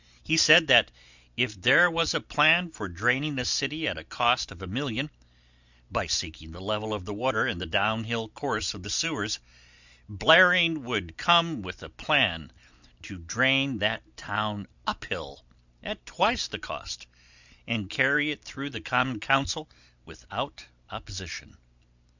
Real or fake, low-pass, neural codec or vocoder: real; 7.2 kHz; none